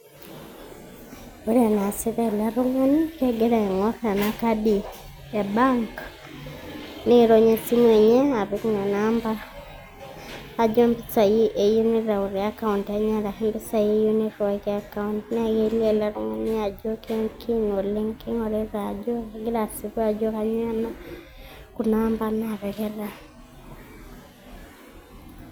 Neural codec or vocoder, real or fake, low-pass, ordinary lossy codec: none; real; none; none